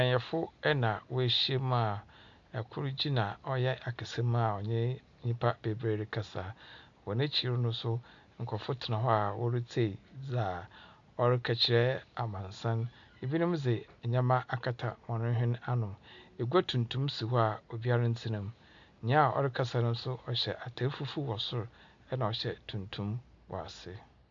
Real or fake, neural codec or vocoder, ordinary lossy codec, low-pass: real; none; MP3, 64 kbps; 7.2 kHz